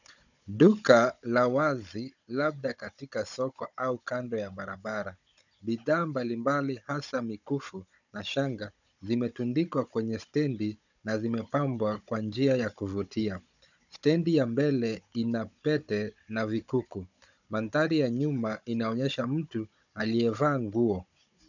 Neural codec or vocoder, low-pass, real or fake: codec, 16 kHz, 16 kbps, FunCodec, trained on Chinese and English, 50 frames a second; 7.2 kHz; fake